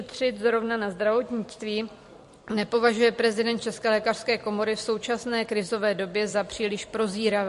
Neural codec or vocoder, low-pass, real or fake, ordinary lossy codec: vocoder, 44.1 kHz, 128 mel bands every 256 samples, BigVGAN v2; 14.4 kHz; fake; MP3, 48 kbps